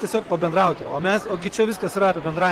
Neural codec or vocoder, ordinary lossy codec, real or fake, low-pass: vocoder, 44.1 kHz, 128 mel bands, Pupu-Vocoder; Opus, 16 kbps; fake; 19.8 kHz